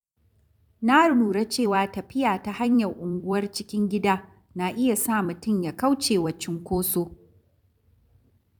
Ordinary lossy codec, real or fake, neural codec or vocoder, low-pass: none; fake; vocoder, 44.1 kHz, 128 mel bands every 256 samples, BigVGAN v2; 19.8 kHz